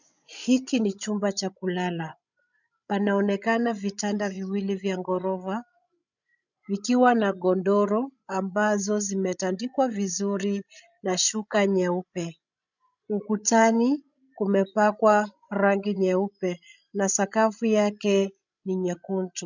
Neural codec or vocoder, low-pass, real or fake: codec, 16 kHz, 16 kbps, FreqCodec, larger model; 7.2 kHz; fake